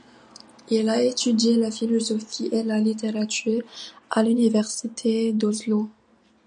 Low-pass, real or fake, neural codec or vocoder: 9.9 kHz; real; none